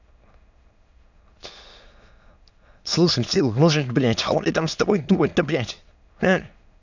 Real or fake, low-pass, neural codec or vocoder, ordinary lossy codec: fake; 7.2 kHz; autoencoder, 22.05 kHz, a latent of 192 numbers a frame, VITS, trained on many speakers; none